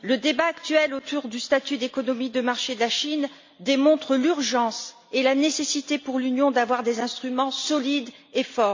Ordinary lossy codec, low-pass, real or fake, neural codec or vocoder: MP3, 64 kbps; 7.2 kHz; real; none